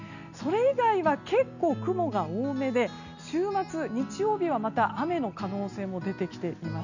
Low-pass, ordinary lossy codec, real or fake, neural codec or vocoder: 7.2 kHz; none; real; none